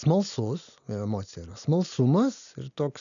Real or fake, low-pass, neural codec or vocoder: real; 7.2 kHz; none